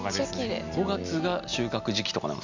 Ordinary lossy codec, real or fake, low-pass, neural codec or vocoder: none; real; 7.2 kHz; none